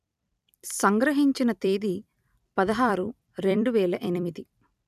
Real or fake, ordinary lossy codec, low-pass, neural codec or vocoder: fake; none; 14.4 kHz; vocoder, 44.1 kHz, 128 mel bands every 256 samples, BigVGAN v2